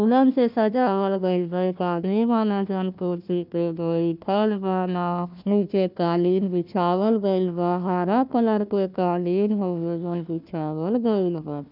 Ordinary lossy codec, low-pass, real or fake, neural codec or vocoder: none; 5.4 kHz; fake; codec, 16 kHz, 1 kbps, FunCodec, trained on Chinese and English, 50 frames a second